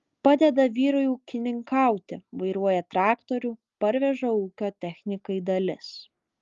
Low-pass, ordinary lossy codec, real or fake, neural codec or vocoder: 7.2 kHz; Opus, 32 kbps; real; none